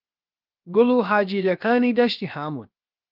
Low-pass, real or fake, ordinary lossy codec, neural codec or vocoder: 5.4 kHz; fake; Opus, 24 kbps; codec, 16 kHz, 0.7 kbps, FocalCodec